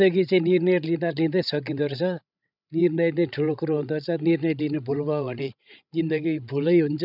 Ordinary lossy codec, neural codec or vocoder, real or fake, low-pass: none; codec, 16 kHz, 16 kbps, FreqCodec, larger model; fake; 5.4 kHz